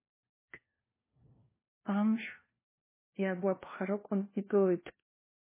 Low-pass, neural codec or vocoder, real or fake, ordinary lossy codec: 3.6 kHz; codec, 16 kHz, 1 kbps, FunCodec, trained on LibriTTS, 50 frames a second; fake; MP3, 16 kbps